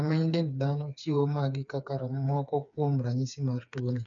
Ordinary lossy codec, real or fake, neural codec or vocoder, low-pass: none; fake; codec, 16 kHz, 4 kbps, FreqCodec, smaller model; 7.2 kHz